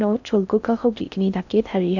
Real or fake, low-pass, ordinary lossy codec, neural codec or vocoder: fake; 7.2 kHz; none; codec, 16 kHz in and 24 kHz out, 0.6 kbps, FocalCodec, streaming, 4096 codes